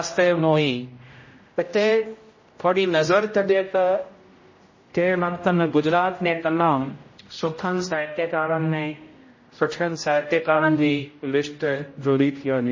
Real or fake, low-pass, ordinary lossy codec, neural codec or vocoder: fake; 7.2 kHz; MP3, 32 kbps; codec, 16 kHz, 0.5 kbps, X-Codec, HuBERT features, trained on general audio